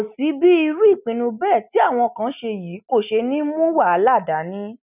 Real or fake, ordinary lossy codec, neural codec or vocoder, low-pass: real; none; none; 3.6 kHz